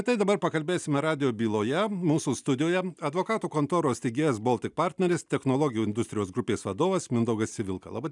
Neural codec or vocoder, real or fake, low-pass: none; real; 10.8 kHz